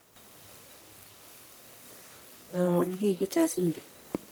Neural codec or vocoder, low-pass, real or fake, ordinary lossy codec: codec, 44.1 kHz, 1.7 kbps, Pupu-Codec; none; fake; none